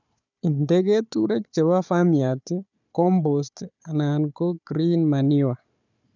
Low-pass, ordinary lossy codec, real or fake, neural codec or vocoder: 7.2 kHz; none; fake; codec, 16 kHz, 4 kbps, FunCodec, trained on Chinese and English, 50 frames a second